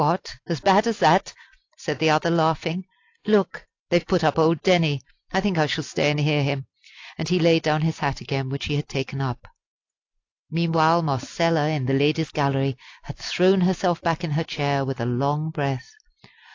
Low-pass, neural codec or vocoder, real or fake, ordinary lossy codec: 7.2 kHz; none; real; AAC, 48 kbps